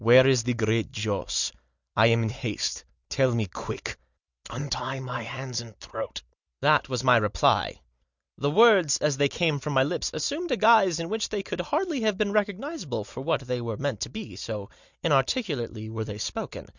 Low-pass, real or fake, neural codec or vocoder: 7.2 kHz; real; none